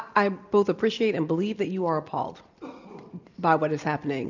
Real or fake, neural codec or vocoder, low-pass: real; none; 7.2 kHz